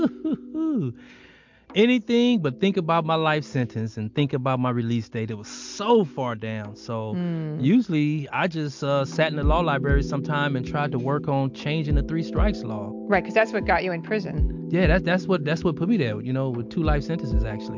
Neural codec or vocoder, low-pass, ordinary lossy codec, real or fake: none; 7.2 kHz; MP3, 64 kbps; real